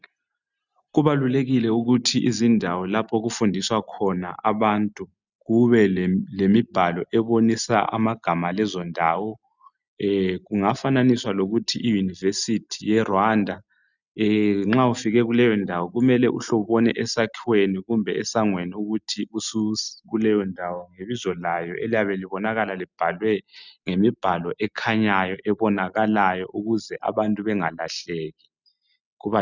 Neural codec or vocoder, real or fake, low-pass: none; real; 7.2 kHz